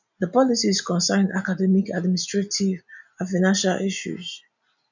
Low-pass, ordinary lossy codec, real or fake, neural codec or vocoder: 7.2 kHz; none; real; none